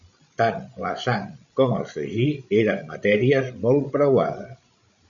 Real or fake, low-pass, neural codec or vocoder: fake; 7.2 kHz; codec, 16 kHz, 16 kbps, FreqCodec, larger model